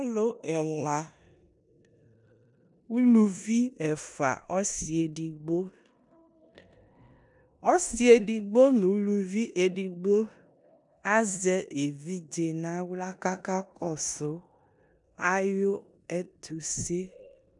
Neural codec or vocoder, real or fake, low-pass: codec, 16 kHz in and 24 kHz out, 0.9 kbps, LongCat-Audio-Codec, four codebook decoder; fake; 10.8 kHz